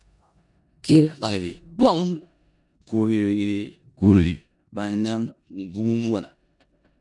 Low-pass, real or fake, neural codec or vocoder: 10.8 kHz; fake; codec, 16 kHz in and 24 kHz out, 0.4 kbps, LongCat-Audio-Codec, four codebook decoder